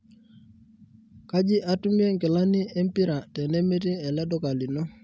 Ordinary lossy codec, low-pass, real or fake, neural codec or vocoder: none; none; real; none